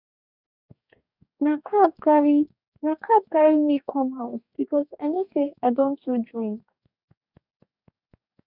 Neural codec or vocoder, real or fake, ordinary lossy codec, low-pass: codec, 44.1 kHz, 2.6 kbps, DAC; fake; none; 5.4 kHz